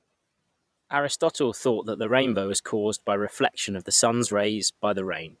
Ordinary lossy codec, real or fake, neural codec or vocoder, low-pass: none; fake; vocoder, 22.05 kHz, 80 mel bands, Vocos; none